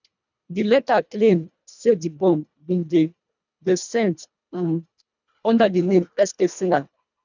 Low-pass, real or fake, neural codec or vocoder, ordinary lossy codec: 7.2 kHz; fake; codec, 24 kHz, 1.5 kbps, HILCodec; none